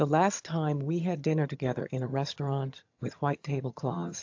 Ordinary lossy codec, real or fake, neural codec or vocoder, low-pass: AAC, 48 kbps; fake; vocoder, 22.05 kHz, 80 mel bands, HiFi-GAN; 7.2 kHz